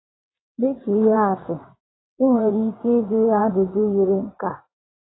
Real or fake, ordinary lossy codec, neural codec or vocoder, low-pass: fake; AAC, 16 kbps; vocoder, 22.05 kHz, 80 mel bands, WaveNeXt; 7.2 kHz